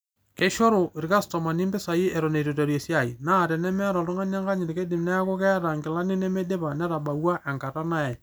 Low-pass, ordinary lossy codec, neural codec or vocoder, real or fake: none; none; none; real